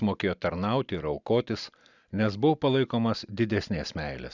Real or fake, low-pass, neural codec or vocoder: real; 7.2 kHz; none